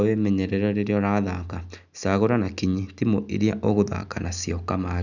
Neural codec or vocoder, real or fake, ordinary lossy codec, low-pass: none; real; none; 7.2 kHz